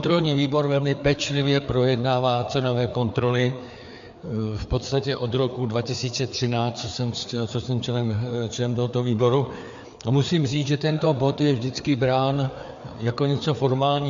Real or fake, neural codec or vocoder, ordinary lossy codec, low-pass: fake; codec, 16 kHz, 4 kbps, FreqCodec, larger model; MP3, 64 kbps; 7.2 kHz